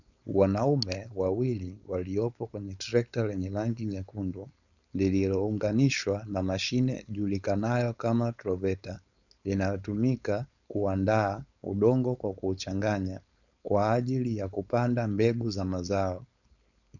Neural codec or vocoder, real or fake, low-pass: codec, 16 kHz, 4.8 kbps, FACodec; fake; 7.2 kHz